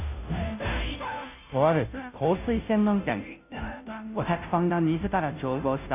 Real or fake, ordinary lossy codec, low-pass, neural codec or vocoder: fake; none; 3.6 kHz; codec, 16 kHz, 0.5 kbps, FunCodec, trained on Chinese and English, 25 frames a second